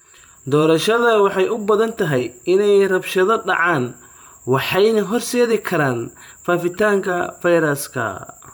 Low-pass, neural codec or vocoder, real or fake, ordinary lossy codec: none; vocoder, 44.1 kHz, 128 mel bands every 512 samples, BigVGAN v2; fake; none